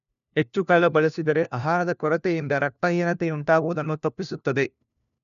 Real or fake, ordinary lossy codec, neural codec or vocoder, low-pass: fake; none; codec, 16 kHz, 1 kbps, FunCodec, trained on LibriTTS, 50 frames a second; 7.2 kHz